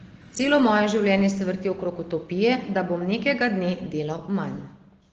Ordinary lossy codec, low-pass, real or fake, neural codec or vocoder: Opus, 16 kbps; 7.2 kHz; real; none